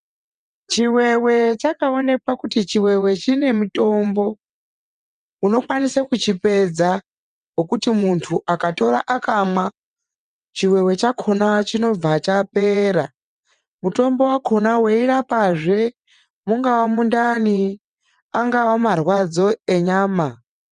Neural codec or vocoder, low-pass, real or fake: vocoder, 22.05 kHz, 80 mel bands, WaveNeXt; 9.9 kHz; fake